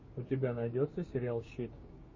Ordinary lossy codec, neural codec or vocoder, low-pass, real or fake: MP3, 32 kbps; codec, 16 kHz, 6 kbps, DAC; 7.2 kHz; fake